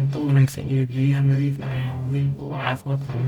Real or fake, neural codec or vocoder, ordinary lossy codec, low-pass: fake; codec, 44.1 kHz, 0.9 kbps, DAC; none; 19.8 kHz